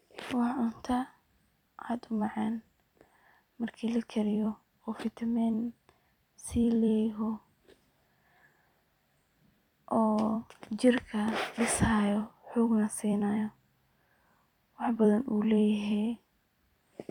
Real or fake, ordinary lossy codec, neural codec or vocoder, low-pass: fake; none; vocoder, 44.1 kHz, 128 mel bands every 256 samples, BigVGAN v2; 19.8 kHz